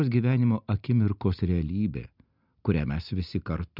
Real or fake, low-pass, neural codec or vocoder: real; 5.4 kHz; none